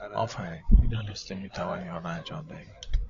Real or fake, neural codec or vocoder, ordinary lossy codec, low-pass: fake; codec, 16 kHz, 16 kbps, FreqCodec, larger model; AAC, 64 kbps; 7.2 kHz